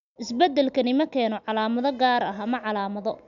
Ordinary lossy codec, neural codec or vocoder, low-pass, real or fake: none; none; 7.2 kHz; real